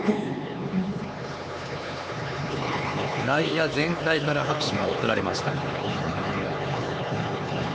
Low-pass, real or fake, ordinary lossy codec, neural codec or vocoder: none; fake; none; codec, 16 kHz, 4 kbps, X-Codec, HuBERT features, trained on LibriSpeech